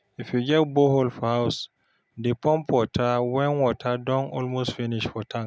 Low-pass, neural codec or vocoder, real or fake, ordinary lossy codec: none; none; real; none